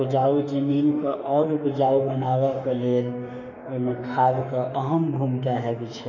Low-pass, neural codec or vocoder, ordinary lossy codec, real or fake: 7.2 kHz; autoencoder, 48 kHz, 32 numbers a frame, DAC-VAE, trained on Japanese speech; none; fake